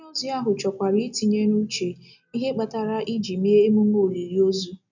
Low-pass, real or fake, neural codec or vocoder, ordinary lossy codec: 7.2 kHz; real; none; none